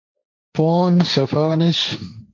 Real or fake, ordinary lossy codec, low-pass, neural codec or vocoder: fake; MP3, 48 kbps; 7.2 kHz; codec, 16 kHz, 1.1 kbps, Voila-Tokenizer